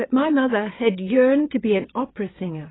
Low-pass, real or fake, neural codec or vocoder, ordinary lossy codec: 7.2 kHz; fake; vocoder, 22.05 kHz, 80 mel bands, WaveNeXt; AAC, 16 kbps